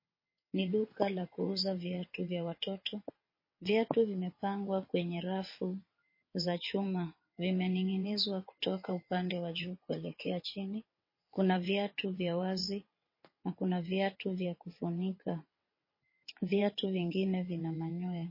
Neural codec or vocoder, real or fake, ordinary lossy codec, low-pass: vocoder, 22.05 kHz, 80 mel bands, WaveNeXt; fake; MP3, 24 kbps; 5.4 kHz